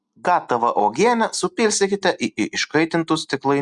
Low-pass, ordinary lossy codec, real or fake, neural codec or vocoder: 10.8 kHz; Opus, 64 kbps; real; none